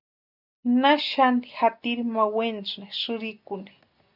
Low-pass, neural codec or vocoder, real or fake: 5.4 kHz; none; real